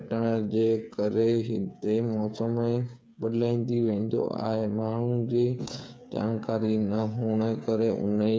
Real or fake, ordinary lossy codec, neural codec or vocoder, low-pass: fake; none; codec, 16 kHz, 8 kbps, FreqCodec, smaller model; none